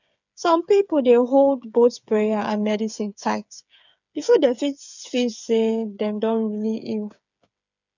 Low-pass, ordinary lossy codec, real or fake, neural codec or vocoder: 7.2 kHz; none; fake; codec, 16 kHz, 8 kbps, FreqCodec, smaller model